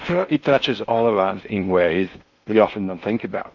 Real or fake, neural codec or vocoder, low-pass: fake; codec, 16 kHz in and 24 kHz out, 0.8 kbps, FocalCodec, streaming, 65536 codes; 7.2 kHz